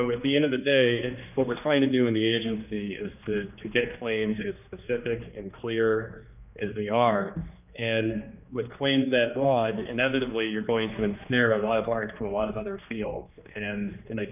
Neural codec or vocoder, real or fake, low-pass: codec, 16 kHz, 2 kbps, X-Codec, HuBERT features, trained on general audio; fake; 3.6 kHz